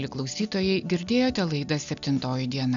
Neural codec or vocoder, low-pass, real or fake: none; 7.2 kHz; real